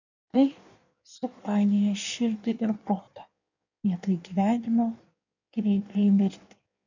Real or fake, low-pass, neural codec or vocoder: fake; 7.2 kHz; codec, 16 kHz in and 24 kHz out, 1.1 kbps, FireRedTTS-2 codec